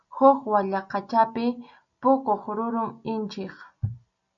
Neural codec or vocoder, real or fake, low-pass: none; real; 7.2 kHz